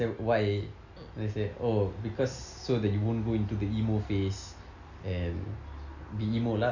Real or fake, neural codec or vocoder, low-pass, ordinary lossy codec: real; none; 7.2 kHz; none